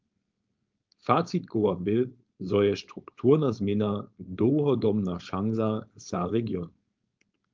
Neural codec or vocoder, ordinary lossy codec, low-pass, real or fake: codec, 16 kHz, 4.8 kbps, FACodec; Opus, 24 kbps; 7.2 kHz; fake